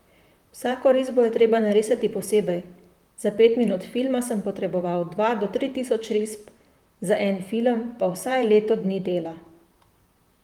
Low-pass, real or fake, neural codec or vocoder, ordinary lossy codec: 19.8 kHz; fake; vocoder, 44.1 kHz, 128 mel bands, Pupu-Vocoder; Opus, 32 kbps